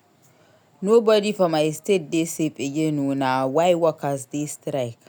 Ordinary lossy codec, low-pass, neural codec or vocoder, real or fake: none; none; none; real